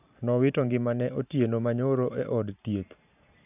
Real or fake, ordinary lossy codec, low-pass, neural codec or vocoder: fake; none; 3.6 kHz; vocoder, 44.1 kHz, 128 mel bands every 512 samples, BigVGAN v2